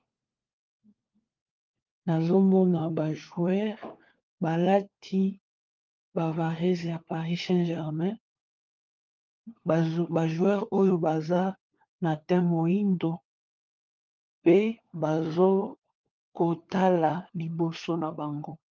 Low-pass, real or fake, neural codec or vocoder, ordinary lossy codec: 7.2 kHz; fake; codec, 16 kHz, 4 kbps, FunCodec, trained on LibriTTS, 50 frames a second; Opus, 32 kbps